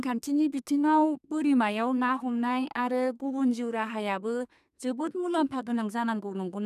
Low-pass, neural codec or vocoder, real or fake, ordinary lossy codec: 14.4 kHz; codec, 32 kHz, 1.9 kbps, SNAC; fake; none